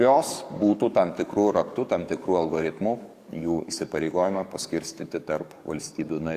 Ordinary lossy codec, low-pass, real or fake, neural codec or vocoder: Opus, 64 kbps; 14.4 kHz; fake; codec, 44.1 kHz, 7.8 kbps, DAC